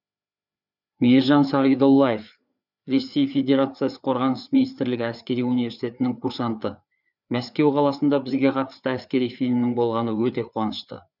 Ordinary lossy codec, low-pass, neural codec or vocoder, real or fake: none; 5.4 kHz; codec, 16 kHz, 4 kbps, FreqCodec, larger model; fake